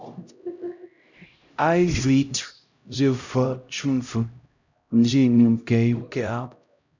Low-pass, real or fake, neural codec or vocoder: 7.2 kHz; fake; codec, 16 kHz, 0.5 kbps, X-Codec, HuBERT features, trained on LibriSpeech